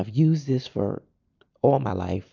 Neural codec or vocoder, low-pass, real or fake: none; 7.2 kHz; real